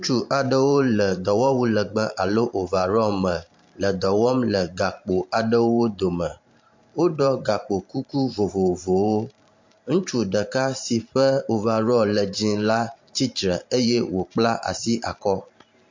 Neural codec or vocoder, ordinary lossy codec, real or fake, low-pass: vocoder, 44.1 kHz, 128 mel bands every 512 samples, BigVGAN v2; MP3, 48 kbps; fake; 7.2 kHz